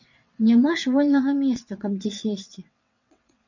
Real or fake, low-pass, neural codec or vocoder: fake; 7.2 kHz; vocoder, 22.05 kHz, 80 mel bands, WaveNeXt